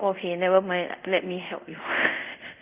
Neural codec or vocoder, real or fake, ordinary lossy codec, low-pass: codec, 24 kHz, 0.5 kbps, DualCodec; fake; Opus, 24 kbps; 3.6 kHz